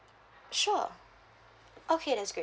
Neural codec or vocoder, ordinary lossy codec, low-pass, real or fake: none; none; none; real